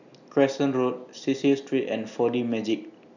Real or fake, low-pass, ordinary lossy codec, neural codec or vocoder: real; 7.2 kHz; none; none